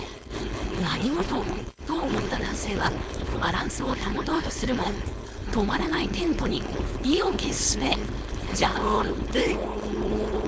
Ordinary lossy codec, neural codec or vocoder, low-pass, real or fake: none; codec, 16 kHz, 4.8 kbps, FACodec; none; fake